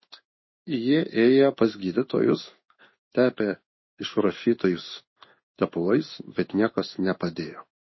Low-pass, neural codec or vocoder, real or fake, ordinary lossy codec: 7.2 kHz; codec, 16 kHz in and 24 kHz out, 1 kbps, XY-Tokenizer; fake; MP3, 24 kbps